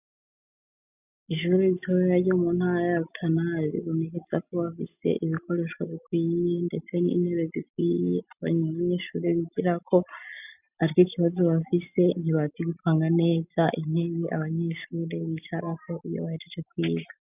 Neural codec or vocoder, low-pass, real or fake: none; 3.6 kHz; real